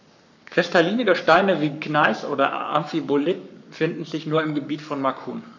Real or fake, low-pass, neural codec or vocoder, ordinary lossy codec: fake; 7.2 kHz; codec, 44.1 kHz, 7.8 kbps, Pupu-Codec; none